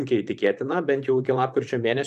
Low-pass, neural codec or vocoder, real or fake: 14.4 kHz; vocoder, 44.1 kHz, 128 mel bands, Pupu-Vocoder; fake